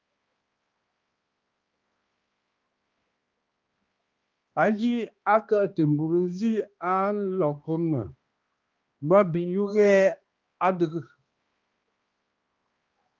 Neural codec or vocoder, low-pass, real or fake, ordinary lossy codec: codec, 16 kHz, 1 kbps, X-Codec, HuBERT features, trained on balanced general audio; 7.2 kHz; fake; Opus, 32 kbps